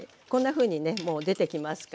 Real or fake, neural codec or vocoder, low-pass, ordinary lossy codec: real; none; none; none